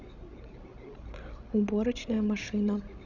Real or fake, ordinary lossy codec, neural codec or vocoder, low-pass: fake; none; codec, 16 kHz, 16 kbps, FreqCodec, larger model; 7.2 kHz